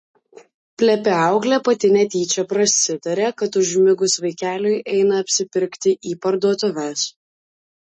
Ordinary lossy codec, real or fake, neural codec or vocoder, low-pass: MP3, 32 kbps; real; none; 9.9 kHz